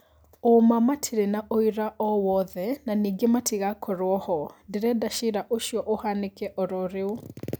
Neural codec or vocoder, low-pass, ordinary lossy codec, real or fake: none; none; none; real